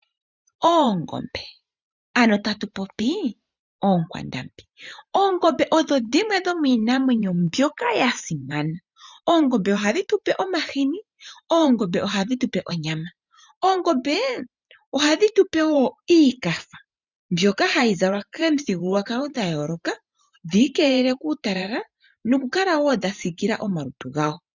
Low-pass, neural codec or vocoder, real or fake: 7.2 kHz; vocoder, 44.1 kHz, 128 mel bands every 512 samples, BigVGAN v2; fake